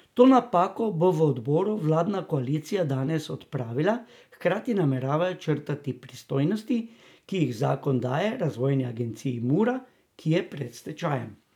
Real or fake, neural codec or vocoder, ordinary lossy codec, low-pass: real; none; none; 19.8 kHz